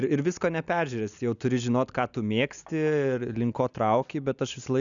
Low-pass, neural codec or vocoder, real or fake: 7.2 kHz; none; real